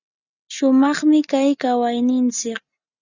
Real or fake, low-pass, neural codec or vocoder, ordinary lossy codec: real; 7.2 kHz; none; Opus, 64 kbps